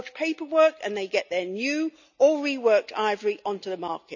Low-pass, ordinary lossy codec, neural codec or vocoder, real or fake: 7.2 kHz; none; none; real